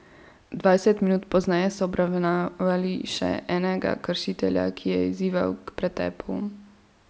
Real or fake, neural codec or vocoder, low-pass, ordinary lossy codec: real; none; none; none